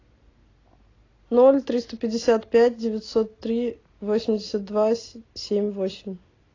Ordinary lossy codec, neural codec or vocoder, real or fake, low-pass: AAC, 32 kbps; none; real; 7.2 kHz